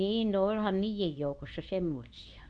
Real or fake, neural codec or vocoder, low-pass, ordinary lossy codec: fake; codec, 24 kHz, 0.9 kbps, WavTokenizer, medium speech release version 2; 9.9 kHz; none